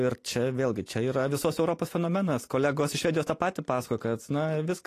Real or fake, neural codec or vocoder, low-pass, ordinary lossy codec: real; none; 14.4 kHz; AAC, 48 kbps